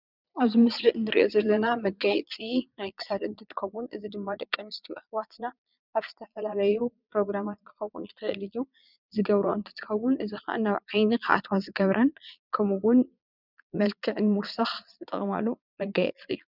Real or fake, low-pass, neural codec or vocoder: fake; 5.4 kHz; vocoder, 22.05 kHz, 80 mel bands, Vocos